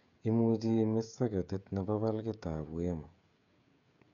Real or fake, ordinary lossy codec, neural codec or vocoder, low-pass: fake; none; codec, 16 kHz, 16 kbps, FreqCodec, smaller model; 7.2 kHz